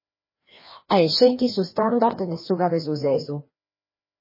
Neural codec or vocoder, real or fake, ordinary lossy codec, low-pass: codec, 16 kHz, 1 kbps, FreqCodec, larger model; fake; MP3, 24 kbps; 5.4 kHz